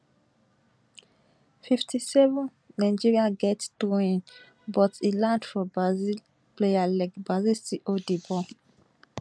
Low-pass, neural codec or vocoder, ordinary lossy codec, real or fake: none; none; none; real